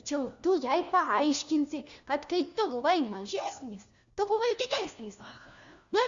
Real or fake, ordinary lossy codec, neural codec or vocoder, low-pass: fake; Opus, 64 kbps; codec, 16 kHz, 1 kbps, FunCodec, trained on LibriTTS, 50 frames a second; 7.2 kHz